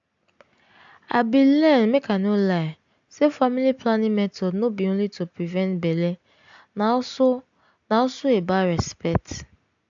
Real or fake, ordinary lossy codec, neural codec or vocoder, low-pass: real; AAC, 64 kbps; none; 7.2 kHz